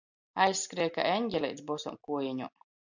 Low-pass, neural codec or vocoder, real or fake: 7.2 kHz; none; real